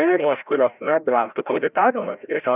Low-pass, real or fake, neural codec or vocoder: 3.6 kHz; fake; codec, 16 kHz, 0.5 kbps, FreqCodec, larger model